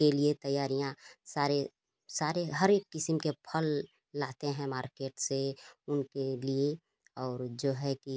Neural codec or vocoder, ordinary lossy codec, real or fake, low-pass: none; none; real; none